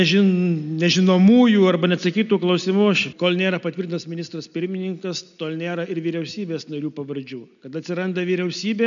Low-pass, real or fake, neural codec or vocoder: 7.2 kHz; real; none